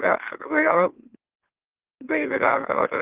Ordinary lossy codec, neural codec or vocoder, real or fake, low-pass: Opus, 16 kbps; autoencoder, 44.1 kHz, a latent of 192 numbers a frame, MeloTTS; fake; 3.6 kHz